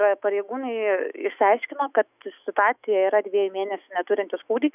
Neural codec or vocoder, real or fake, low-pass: autoencoder, 48 kHz, 128 numbers a frame, DAC-VAE, trained on Japanese speech; fake; 3.6 kHz